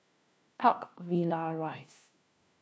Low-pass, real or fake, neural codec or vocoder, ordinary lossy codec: none; fake; codec, 16 kHz, 1 kbps, FunCodec, trained on LibriTTS, 50 frames a second; none